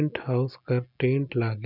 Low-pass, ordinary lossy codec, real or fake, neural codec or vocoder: 5.4 kHz; none; real; none